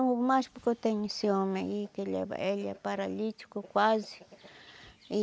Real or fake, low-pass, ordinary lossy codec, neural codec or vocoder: real; none; none; none